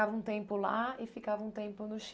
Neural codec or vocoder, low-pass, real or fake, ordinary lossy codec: none; none; real; none